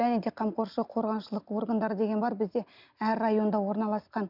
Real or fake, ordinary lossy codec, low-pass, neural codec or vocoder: real; none; 5.4 kHz; none